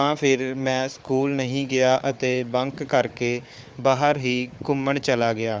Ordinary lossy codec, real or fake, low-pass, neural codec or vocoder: none; fake; none; codec, 16 kHz, 4 kbps, FunCodec, trained on Chinese and English, 50 frames a second